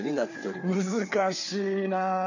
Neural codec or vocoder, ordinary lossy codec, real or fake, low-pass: codec, 16 kHz, 4 kbps, FreqCodec, larger model; none; fake; 7.2 kHz